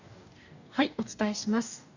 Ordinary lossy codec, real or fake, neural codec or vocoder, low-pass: none; fake; codec, 44.1 kHz, 2.6 kbps, DAC; 7.2 kHz